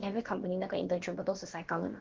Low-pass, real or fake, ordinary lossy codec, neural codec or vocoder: 7.2 kHz; fake; Opus, 16 kbps; codec, 16 kHz, about 1 kbps, DyCAST, with the encoder's durations